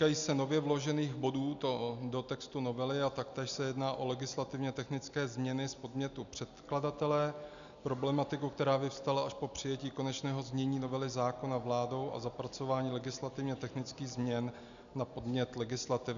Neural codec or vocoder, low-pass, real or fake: none; 7.2 kHz; real